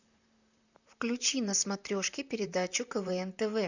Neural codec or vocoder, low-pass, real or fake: vocoder, 44.1 kHz, 128 mel bands, Pupu-Vocoder; 7.2 kHz; fake